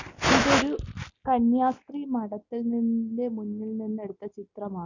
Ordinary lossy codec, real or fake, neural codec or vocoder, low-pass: none; real; none; 7.2 kHz